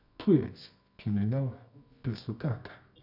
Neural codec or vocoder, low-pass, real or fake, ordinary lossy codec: codec, 24 kHz, 0.9 kbps, WavTokenizer, medium music audio release; 5.4 kHz; fake; none